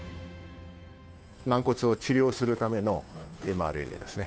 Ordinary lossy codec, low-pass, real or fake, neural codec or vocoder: none; none; fake; codec, 16 kHz, 2 kbps, FunCodec, trained on Chinese and English, 25 frames a second